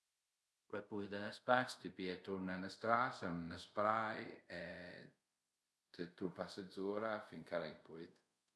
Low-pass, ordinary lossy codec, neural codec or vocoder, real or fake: none; none; codec, 24 kHz, 0.5 kbps, DualCodec; fake